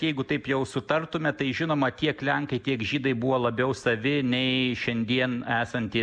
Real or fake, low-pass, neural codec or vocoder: real; 9.9 kHz; none